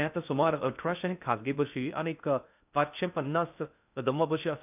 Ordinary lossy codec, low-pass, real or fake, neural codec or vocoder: none; 3.6 kHz; fake; codec, 16 kHz in and 24 kHz out, 0.6 kbps, FocalCodec, streaming, 4096 codes